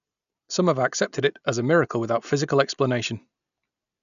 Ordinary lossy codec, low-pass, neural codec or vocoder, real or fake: none; 7.2 kHz; none; real